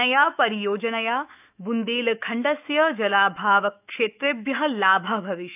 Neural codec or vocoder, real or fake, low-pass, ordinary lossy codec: autoencoder, 48 kHz, 128 numbers a frame, DAC-VAE, trained on Japanese speech; fake; 3.6 kHz; none